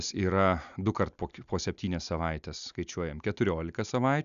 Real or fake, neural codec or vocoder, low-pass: real; none; 7.2 kHz